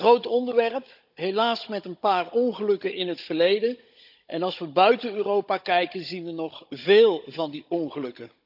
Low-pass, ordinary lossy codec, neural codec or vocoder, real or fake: 5.4 kHz; none; codec, 16 kHz, 16 kbps, FunCodec, trained on Chinese and English, 50 frames a second; fake